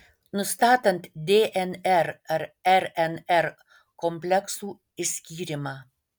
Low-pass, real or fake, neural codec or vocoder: 19.8 kHz; real; none